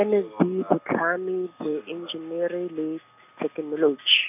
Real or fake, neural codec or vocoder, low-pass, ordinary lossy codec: real; none; 3.6 kHz; none